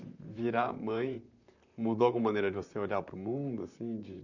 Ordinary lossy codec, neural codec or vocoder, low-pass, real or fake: none; vocoder, 44.1 kHz, 128 mel bands, Pupu-Vocoder; 7.2 kHz; fake